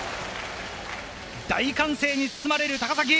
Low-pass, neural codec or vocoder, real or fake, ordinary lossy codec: none; none; real; none